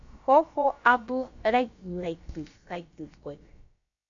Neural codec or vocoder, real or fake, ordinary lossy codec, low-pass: codec, 16 kHz, about 1 kbps, DyCAST, with the encoder's durations; fake; AAC, 64 kbps; 7.2 kHz